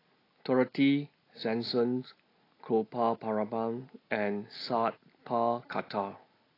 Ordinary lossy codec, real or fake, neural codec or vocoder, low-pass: AAC, 24 kbps; real; none; 5.4 kHz